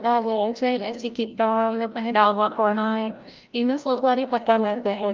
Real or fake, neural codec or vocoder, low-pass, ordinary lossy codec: fake; codec, 16 kHz, 0.5 kbps, FreqCodec, larger model; 7.2 kHz; Opus, 24 kbps